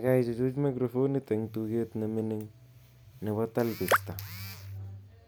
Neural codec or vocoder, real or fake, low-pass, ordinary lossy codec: none; real; none; none